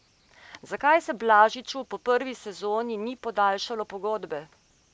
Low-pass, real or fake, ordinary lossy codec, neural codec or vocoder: none; real; none; none